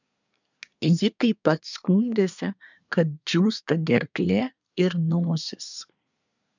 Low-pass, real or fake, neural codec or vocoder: 7.2 kHz; fake; codec, 24 kHz, 1 kbps, SNAC